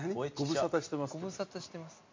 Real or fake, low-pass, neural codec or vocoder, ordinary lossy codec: real; 7.2 kHz; none; MP3, 48 kbps